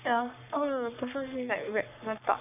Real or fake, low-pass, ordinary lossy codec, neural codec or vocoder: fake; 3.6 kHz; none; codec, 44.1 kHz, 3.4 kbps, Pupu-Codec